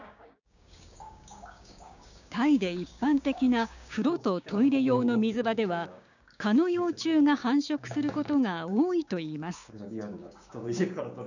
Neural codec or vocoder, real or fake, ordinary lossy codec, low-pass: codec, 16 kHz, 6 kbps, DAC; fake; none; 7.2 kHz